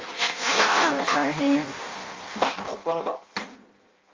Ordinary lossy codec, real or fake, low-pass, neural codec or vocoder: Opus, 32 kbps; fake; 7.2 kHz; codec, 16 kHz in and 24 kHz out, 0.6 kbps, FireRedTTS-2 codec